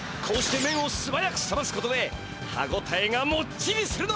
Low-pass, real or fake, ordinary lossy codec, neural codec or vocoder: none; real; none; none